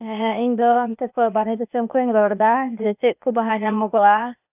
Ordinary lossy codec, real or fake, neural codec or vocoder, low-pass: none; fake; codec, 16 kHz, 0.8 kbps, ZipCodec; 3.6 kHz